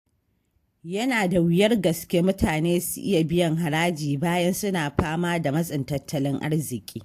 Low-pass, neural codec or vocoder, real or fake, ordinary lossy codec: 14.4 kHz; none; real; AAC, 64 kbps